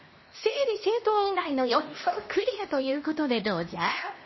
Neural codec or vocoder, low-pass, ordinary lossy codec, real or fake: codec, 16 kHz, 1 kbps, X-Codec, HuBERT features, trained on LibriSpeech; 7.2 kHz; MP3, 24 kbps; fake